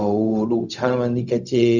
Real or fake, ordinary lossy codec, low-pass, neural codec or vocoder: fake; none; 7.2 kHz; codec, 16 kHz, 0.4 kbps, LongCat-Audio-Codec